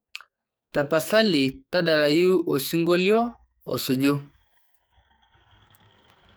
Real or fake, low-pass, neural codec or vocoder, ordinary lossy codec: fake; none; codec, 44.1 kHz, 2.6 kbps, SNAC; none